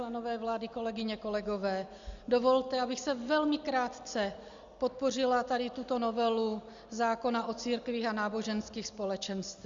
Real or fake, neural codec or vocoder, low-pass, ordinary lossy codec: real; none; 7.2 kHz; Opus, 64 kbps